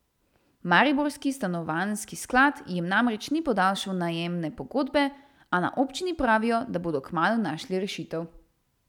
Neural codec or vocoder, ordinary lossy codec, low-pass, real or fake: none; none; 19.8 kHz; real